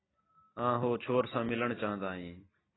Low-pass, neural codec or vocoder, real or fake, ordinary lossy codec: 7.2 kHz; none; real; AAC, 16 kbps